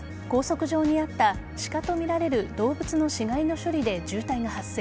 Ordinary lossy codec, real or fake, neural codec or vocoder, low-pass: none; real; none; none